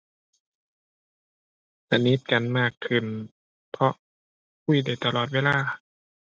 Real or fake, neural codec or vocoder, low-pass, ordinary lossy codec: real; none; none; none